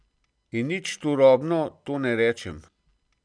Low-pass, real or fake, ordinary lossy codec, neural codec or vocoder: 9.9 kHz; real; none; none